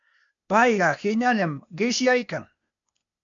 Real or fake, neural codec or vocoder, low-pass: fake; codec, 16 kHz, 0.8 kbps, ZipCodec; 7.2 kHz